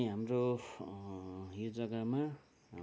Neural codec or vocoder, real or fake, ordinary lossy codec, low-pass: none; real; none; none